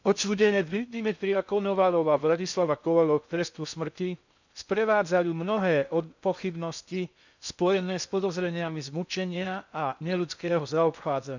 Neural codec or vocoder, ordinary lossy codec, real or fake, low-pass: codec, 16 kHz in and 24 kHz out, 0.6 kbps, FocalCodec, streaming, 2048 codes; none; fake; 7.2 kHz